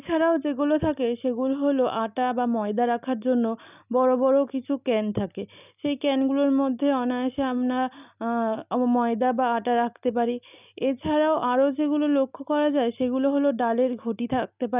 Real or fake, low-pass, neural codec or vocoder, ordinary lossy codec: real; 3.6 kHz; none; none